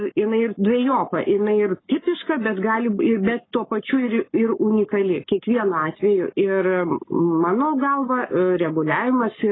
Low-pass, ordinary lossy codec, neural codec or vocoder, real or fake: 7.2 kHz; AAC, 16 kbps; codec, 44.1 kHz, 7.8 kbps, Pupu-Codec; fake